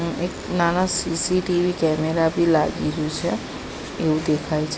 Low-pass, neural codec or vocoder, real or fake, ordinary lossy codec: none; none; real; none